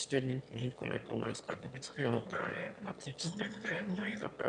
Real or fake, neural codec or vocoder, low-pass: fake; autoencoder, 22.05 kHz, a latent of 192 numbers a frame, VITS, trained on one speaker; 9.9 kHz